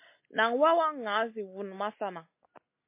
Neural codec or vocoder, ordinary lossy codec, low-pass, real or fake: none; MP3, 24 kbps; 3.6 kHz; real